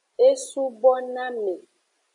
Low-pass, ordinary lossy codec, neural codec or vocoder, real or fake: 10.8 kHz; AAC, 48 kbps; none; real